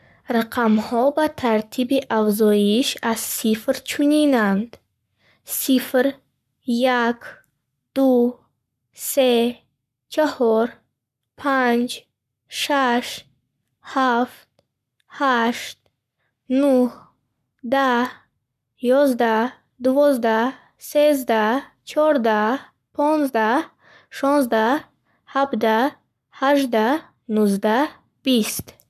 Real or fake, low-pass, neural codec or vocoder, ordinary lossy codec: fake; 14.4 kHz; codec, 44.1 kHz, 7.8 kbps, Pupu-Codec; none